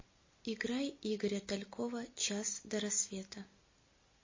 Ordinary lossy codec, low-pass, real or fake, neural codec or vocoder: MP3, 32 kbps; 7.2 kHz; real; none